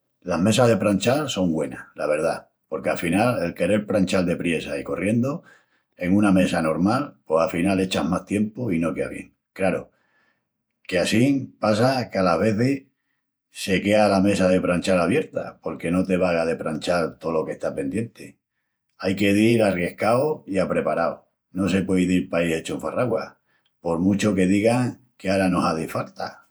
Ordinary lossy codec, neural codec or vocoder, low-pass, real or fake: none; vocoder, 48 kHz, 128 mel bands, Vocos; none; fake